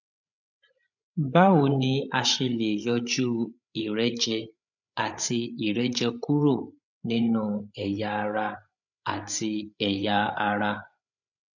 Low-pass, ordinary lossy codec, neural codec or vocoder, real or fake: 7.2 kHz; none; codec, 16 kHz, 8 kbps, FreqCodec, larger model; fake